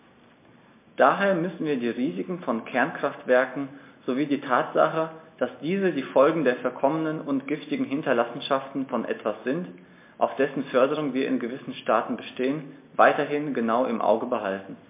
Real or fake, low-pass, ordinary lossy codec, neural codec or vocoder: real; 3.6 kHz; MP3, 24 kbps; none